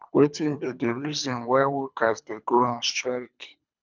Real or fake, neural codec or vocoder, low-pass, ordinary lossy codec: fake; codec, 24 kHz, 3 kbps, HILCodec; 7.2 kHz; none